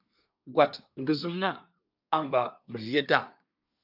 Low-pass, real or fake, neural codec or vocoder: 5.4 kHz; fake; codec, 24 kHz, 1 kbps, SNAC